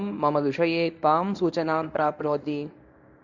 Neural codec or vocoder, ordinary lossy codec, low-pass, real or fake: codec, 24 kHz, 0.9 kbps, WavTokenizer, medium speech release version 1; none; 7.2 kHz; fake